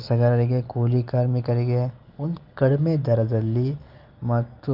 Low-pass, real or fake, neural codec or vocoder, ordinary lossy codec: 5.4 kHz; real; none; Opus, 24 kbps